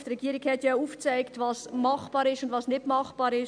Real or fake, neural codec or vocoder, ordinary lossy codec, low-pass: real; none; none; 9.9 kHz